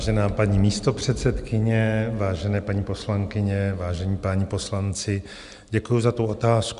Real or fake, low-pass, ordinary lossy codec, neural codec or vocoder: real; 10.8 kHz; Opus, 64 kbps; none